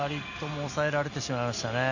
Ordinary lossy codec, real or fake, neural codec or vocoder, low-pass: none; real; none; 7.2 kHz